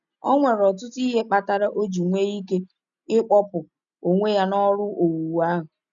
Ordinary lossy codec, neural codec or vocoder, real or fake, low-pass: none; none; real; 7.2 kHz